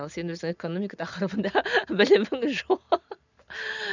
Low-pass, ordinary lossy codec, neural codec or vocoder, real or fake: 7.2 kHz; none; none; real